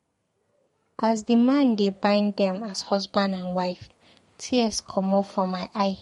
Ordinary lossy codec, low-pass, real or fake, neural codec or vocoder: MP3, 48 kbps; 14.4 kHz; fake; codec, 32 kHz, 1.9 kbps, SNAC